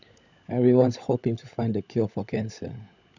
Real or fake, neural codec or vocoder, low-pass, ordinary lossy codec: fake; codec, 16 kHz, 16 kbps, FunCodec, trained on LibriTTS, 50 frames a second; 7.2 kHz; none